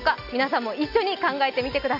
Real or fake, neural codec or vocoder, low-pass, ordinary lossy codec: real; none; 5.4 kHz; AAC, 48 kbps